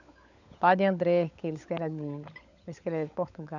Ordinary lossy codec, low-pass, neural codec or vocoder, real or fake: none; 7.2 kHz; codec, 16 kHz, 16 kbps, FunCodec, trained on LibriTTS, 50 frames a second; fake